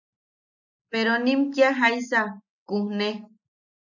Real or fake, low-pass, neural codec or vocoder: real; 7.2 kHz; none